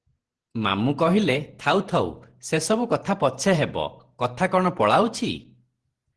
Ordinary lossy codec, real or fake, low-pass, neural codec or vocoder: Opus, 16 kbps; fake; 10.8 kHz; vocoder, 48 kHz, 128 mel bands, Vocos